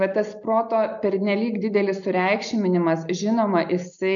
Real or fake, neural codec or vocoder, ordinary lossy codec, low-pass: real; none; MP3, 96 kbps; 7.2 kHz